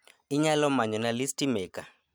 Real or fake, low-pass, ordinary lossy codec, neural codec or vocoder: real; none; none; none